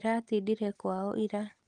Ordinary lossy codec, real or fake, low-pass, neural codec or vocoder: Opus, 24 kbps; real; 10.8 kHz; none